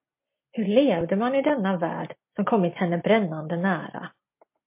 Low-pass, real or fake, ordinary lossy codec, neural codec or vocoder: 3.6 kHz; real; MP3, 24 kbps; none